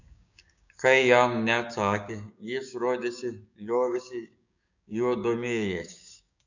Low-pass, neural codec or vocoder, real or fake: 7.2 kHz; codec, 16 kHz, 6 kbps, DAC; fake